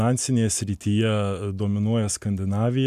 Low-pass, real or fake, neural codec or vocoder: 14.4 kHz; real; none